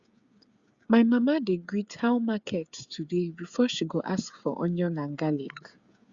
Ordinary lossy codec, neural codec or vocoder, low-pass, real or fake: Opus, 64 kbps; codec, 16 kHz, 8 kbps, FreqCodec, smaller model; 7.2 kHz; fake